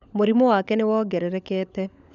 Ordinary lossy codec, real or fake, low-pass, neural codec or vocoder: none; fake; 7.2 kHz; codec, 16 kHz, 16 kbps, FunCodec, trained on LibriTTS, 50 frames a second